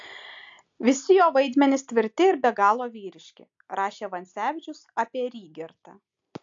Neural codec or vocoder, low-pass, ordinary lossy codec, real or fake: none; 7.2 kHz; AAC, 64 kbps; real